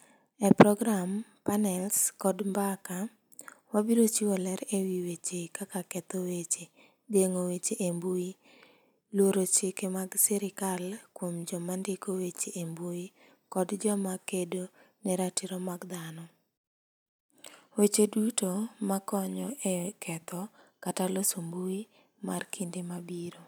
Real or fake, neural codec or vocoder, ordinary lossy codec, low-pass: real; none; none; none